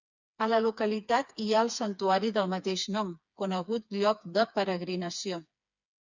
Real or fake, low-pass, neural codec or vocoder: fake; 7.2 kHz; codec, 16 kHz, 4 kbps, FreqCodec, smaller model